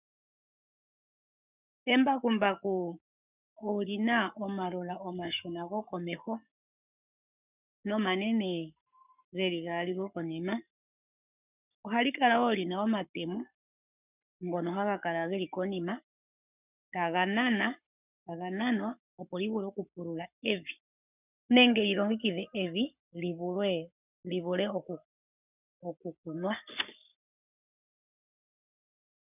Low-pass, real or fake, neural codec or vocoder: 3.6 kHz; fake; codec, 44.1 kHz, 7.8 kbps, Pupu-Codec